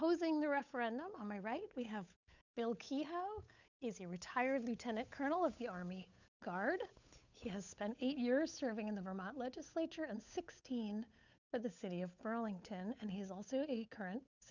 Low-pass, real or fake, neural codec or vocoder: 7.2 kHz; fake; codec, 16 kHz, 8 kbps, FunCodec, trained on Chinese and English, 25 frames a second